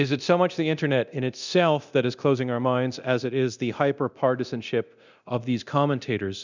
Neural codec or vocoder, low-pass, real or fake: codec, 24 kHz, 0.9 kbps, DualCodec; 7.2 kHz; fake